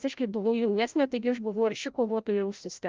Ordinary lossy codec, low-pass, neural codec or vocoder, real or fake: Opus, 24 kbps; 7.2 kHz; codec, 16 kHz, 0.5 kbps, FreqCodec, larger model; fake